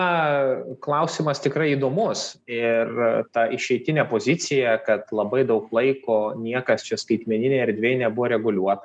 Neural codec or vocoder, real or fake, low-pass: none; real; 9.9 kHz